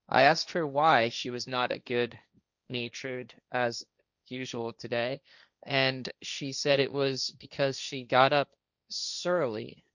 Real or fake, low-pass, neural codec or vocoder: fake; 7.2 kHz; codec, 16 kHz, 1.1 kbps, Voila-Tokenizer